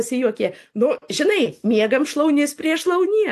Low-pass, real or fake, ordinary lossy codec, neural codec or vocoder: 14.4 kHz; real; AAC, 64 kbps; none